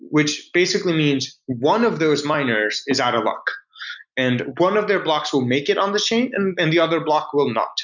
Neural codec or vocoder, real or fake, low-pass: none; real; 7.2 kHz